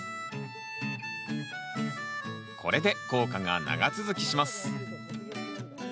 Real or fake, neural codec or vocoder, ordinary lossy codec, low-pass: real; none; none; none